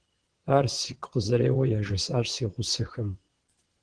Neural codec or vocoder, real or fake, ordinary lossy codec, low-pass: vocoder, 22.05 kHz, 80 mel bands, WaveNeXt; fake; Opus, 16 kbps; 9.9 kHz